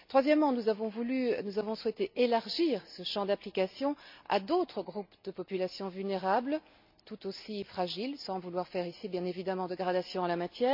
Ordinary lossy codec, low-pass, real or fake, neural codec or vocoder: MP3, 48 kbps; 5.4 kHz; real; none